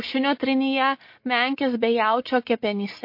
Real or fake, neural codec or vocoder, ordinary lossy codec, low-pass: real; none; MP3, 32 kbps; 5.4 kHz